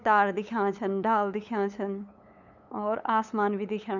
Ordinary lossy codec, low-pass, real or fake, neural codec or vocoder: none; 7.2 kHz; fake; codec, 16 kHz, 8 kbps, FunCodec, trained on LibriTTS, 25 frames a second